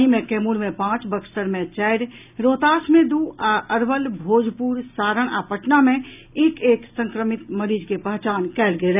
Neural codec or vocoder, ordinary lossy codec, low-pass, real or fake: none; none; 3.6 kHz; real